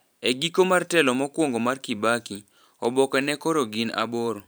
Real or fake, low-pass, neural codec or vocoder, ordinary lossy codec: fake; none; vocoder, 44.1 kHz, 128 mel bands every 512 samples, BigVGAN v2; none